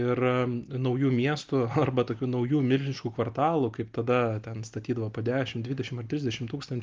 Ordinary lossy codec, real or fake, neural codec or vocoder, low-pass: Opus, 32 kbps; real; none; 7.2 kHz